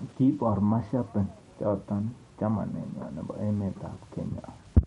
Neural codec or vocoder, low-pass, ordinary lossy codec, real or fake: vocoder, 44.1 kHz, 128 mel bands every 512 samples, BigVGAN v2; 19.8 kHz; MP3, 48 kbps; fake